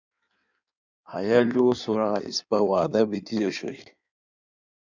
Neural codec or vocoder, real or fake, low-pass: codec, 16 kHz in and 24 kHz out, 1.1 kbps, FireRedTTS-2 codec; fake; 7.2 kHz